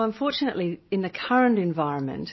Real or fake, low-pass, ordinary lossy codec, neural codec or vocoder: real; 7.2 kHz; MP3, 24 kbps; none